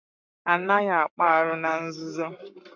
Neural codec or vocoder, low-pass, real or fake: codec, 44.1 kHz, 7.8 kbps, Pupu-Codec; 7.2 kHz; fake